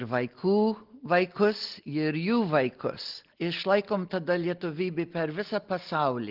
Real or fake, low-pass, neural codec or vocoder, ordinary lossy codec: real; 5.4 kHz; none; Opus, 16 kbps